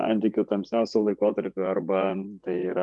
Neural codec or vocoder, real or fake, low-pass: vocoder, 24 kHz, 100 mel bands, Vocos; fake; 10.8 kHz